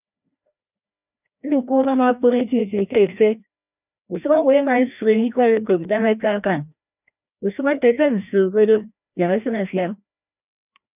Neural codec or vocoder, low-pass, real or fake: codec, 16 kHz, 1 kbps, FreqCodec, larger model; 3.6 kHz; fake